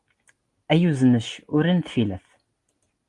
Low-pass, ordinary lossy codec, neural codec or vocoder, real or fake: 10.8 kHz; Opus, 24 kbps; none; real